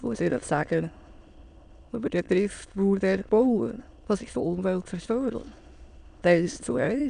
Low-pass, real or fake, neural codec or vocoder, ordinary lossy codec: 9.9 kHz; fake; autoencoder, 22.05 kHz, a latent of 192 numbers a frame, VITS, trained on many speakers; none